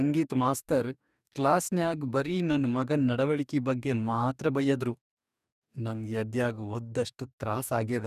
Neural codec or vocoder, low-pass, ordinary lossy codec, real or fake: codec, 44.1 kHz, 2.6 kbps, DAC; 14.4 kHz; none; fake